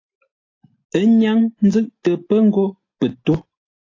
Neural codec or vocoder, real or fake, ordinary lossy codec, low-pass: none; real; AAC, 32 kbps; 7.2 kHz